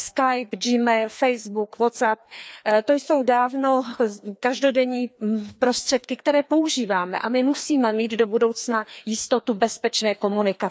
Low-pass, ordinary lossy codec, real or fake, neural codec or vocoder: none; none; fake; codec, 16 kHz, 2 kbps, FreqCodec, larger model